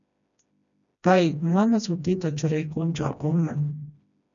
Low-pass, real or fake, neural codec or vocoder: 7.2 kHz; fake; codec, 16 kHz, 1 kbps, FreqCodec, smaller model